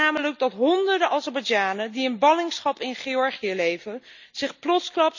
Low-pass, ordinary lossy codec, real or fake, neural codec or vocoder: 7.2 kHz; none; real; none